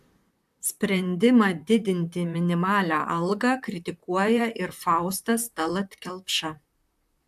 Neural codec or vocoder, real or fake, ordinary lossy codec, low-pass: vocoder, 44.1 kHz, 128 mel bands, Pupu-Vocoder; fake; AAC, 96 kbps; 14.4 kHz